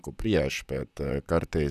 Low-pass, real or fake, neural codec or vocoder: 14.4 kHz; fake; vocoder, 44.1 kHz, 128 mel bands, Pupu-Vocoder